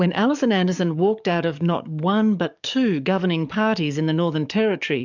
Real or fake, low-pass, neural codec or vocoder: fake; 7.2 kHz; codec, 44.1 kHz, 7.8 kbps, DAC